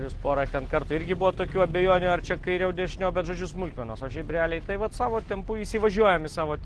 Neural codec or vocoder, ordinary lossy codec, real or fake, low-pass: none; Opus, 16 kbps; real; 10.8 kHz